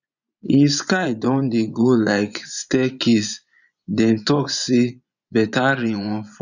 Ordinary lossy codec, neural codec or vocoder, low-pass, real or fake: none; vocoder, 24 kHz, 100 mel bands, Vocos; 7.2 kHz; fake